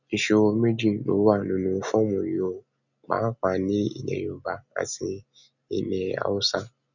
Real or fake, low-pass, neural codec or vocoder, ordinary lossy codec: real; 7.2 kHz; none; none